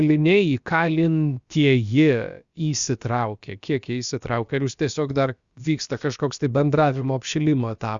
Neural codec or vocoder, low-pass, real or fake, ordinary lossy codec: codec, 16 kHz, about 1 kbps, DyCAST, with the encoder's durations; 7.2 kHz; fake; Opus, 64 kbps